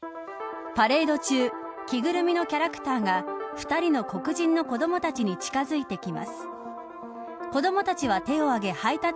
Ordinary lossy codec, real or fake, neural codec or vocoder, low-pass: none; real; none; none